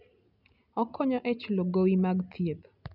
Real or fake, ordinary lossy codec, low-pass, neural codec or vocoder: real; none; 5.4 kHz; none